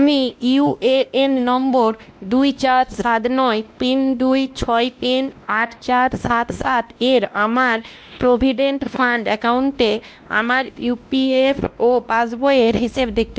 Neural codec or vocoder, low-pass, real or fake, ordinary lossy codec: codec, 16 kHz, 1 kbps, X-Codec, WavLM features, trained on Multilingual LibriSpeech; none; fake; none